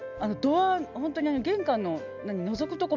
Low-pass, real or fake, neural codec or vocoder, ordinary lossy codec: 7.2 kHz; real; none; none